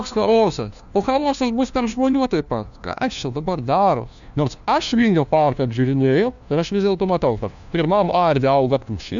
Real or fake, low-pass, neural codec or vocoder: fake; 7.2 kHz; codec, 16 kHz, 1 kbps, FunCodec, trained on LibriTTS, 50 frames a second